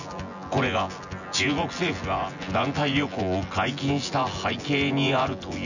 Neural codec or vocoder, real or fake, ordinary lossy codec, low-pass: vocoder, 24 kHz, 100 mel bands, Vocos; fake; none; 7.2 kHz